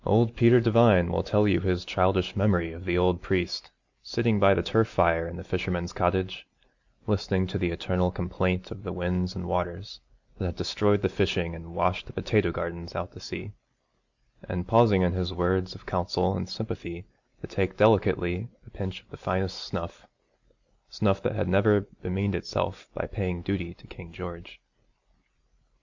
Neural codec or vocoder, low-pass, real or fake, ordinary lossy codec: none; 7.2 kHz; real; Opus, 64 kbps